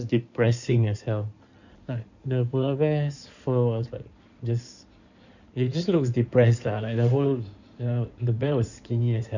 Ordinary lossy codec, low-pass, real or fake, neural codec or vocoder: none; 7.2 kHz; fake; codec, 16 kHz in and 24 kHz out, 2.2 kbps, FireRedTTS-2 codec